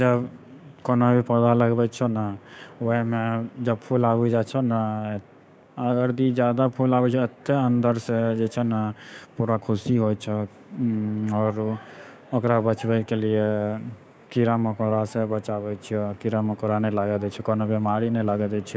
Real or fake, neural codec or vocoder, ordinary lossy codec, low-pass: fake; codec, 16 kHz, 6 kbps, DAC; none; none